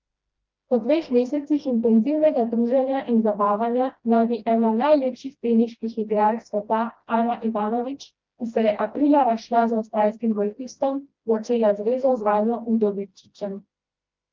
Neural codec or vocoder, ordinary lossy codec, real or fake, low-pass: codec, 16 kHz, 1 kbps, FreqCodec, smaller model; Opus, 24 kbps; fake; 7.2 kHz